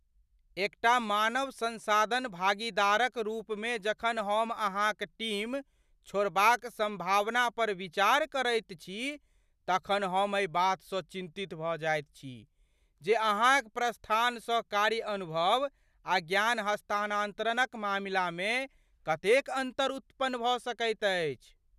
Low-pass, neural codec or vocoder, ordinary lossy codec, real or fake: 14.4 kHz; none; none; real